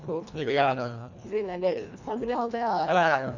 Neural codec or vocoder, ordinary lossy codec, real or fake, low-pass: codec, 24 kHz, 1.5 kbps, HILCodec; none; fake; 7.2 kHz